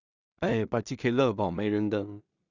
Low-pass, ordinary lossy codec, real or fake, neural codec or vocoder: 7.2 kHz; Opus, 64 kbps; fake; codec, 16 kHz in and 24 kHz out, 0.4 kbps, LongCat-Audio-Codec, two codebook decoder